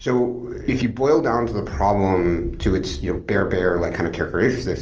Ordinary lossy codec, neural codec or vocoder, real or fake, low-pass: Opus, 24 kbps; none; real; 7.2 kHz